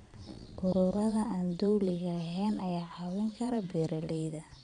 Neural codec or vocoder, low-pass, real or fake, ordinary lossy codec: vocoder, 22.05 kHz, 80 mel bands, Vocos; 9.9 kHz; fake; none